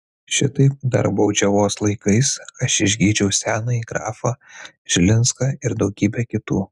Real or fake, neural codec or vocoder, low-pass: real; none; 10.8 kHz